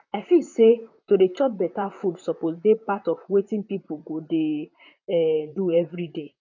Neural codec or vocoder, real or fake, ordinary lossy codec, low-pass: vocoder, 44.1 kHz, 128 mel bands, Pupu-Vocoder; fake; none; 7.2 kHz